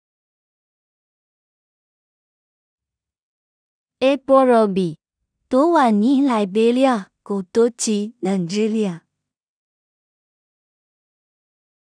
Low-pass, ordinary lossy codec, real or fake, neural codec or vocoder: 9.9 kHz; none; fake; codec, 16 kHz in and 24 kHz out, 0.4 kbps, LongCat-Audio-Codec, two codebook decoder